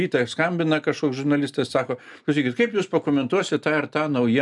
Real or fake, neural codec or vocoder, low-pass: real; none; 10.8 kHz